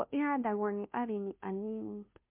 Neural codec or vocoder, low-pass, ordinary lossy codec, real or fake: codec, 16 kHz, 0.5 kbps, FunCodec, trained on Chinese and English, 25 frames a second; 3.6 kHz; MP3, 32 kbps; fake